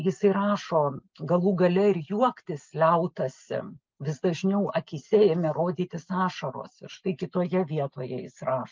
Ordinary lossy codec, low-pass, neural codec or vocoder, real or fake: Opus, 24 kbps; 7.2 kHz; none; real